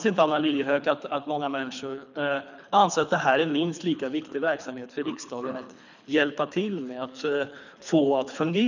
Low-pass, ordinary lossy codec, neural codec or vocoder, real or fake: 7.2 kHz; none; codec, 24 kHz, 3 kbps, HILCodec; fake